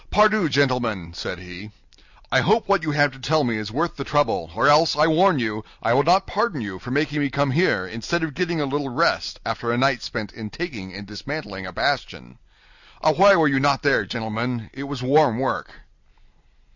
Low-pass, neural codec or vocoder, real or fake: 7.2 kHz; none; real